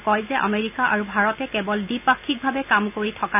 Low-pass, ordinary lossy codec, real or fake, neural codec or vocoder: 3.6 kHz; none; real; none